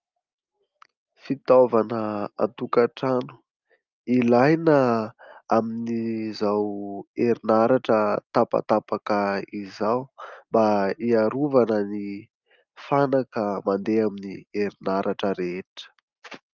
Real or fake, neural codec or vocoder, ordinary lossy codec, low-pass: real; none; Opus, 24 kbps; 7.2 kHz